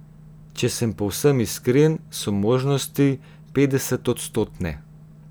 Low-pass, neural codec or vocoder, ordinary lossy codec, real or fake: none; none; none; real